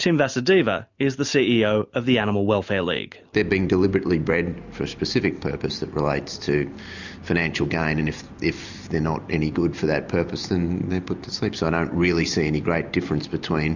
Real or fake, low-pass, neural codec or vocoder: real; 7.2 kHz; none